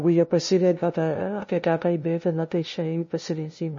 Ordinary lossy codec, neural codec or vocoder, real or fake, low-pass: MP3, 32 kbps; codec, 16 kHz, 0.5 kbps, FunCodec, trained on LibriTTS, 25 frames a second; fake; 7.2 kHz